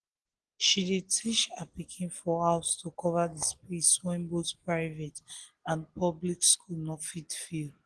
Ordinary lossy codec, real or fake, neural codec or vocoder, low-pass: Opus, 16 kbps; real; none; 10.8 kHz